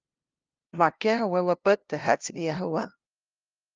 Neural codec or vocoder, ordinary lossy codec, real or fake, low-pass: codec, 16 kHz, 0.5 kbps, FunCodec, trained on LibriTTS, 25 frames a second; Opus, 24 kbps; fake; 7.2 kHz